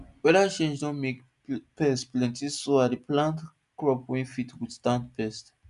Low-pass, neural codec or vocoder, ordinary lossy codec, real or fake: 10.8 kHz; none; none; real